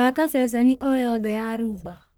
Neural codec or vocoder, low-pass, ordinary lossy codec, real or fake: codec, 44.1 kHz, 1.7 kbps, Pupu-Codec; none; none; fake